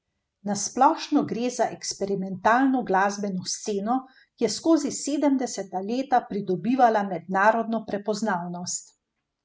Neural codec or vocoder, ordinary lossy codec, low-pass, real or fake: none; none; none; real